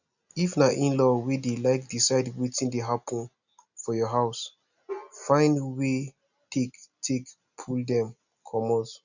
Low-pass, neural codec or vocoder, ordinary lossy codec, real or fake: 7.2 kHz; none; none; real